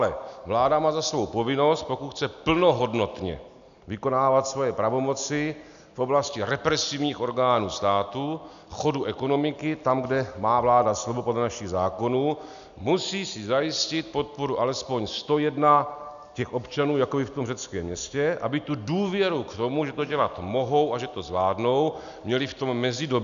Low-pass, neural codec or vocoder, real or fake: 7.2 kHz; none; real